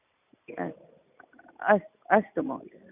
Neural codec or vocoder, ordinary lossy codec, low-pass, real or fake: none; none; 3.6 kHz; real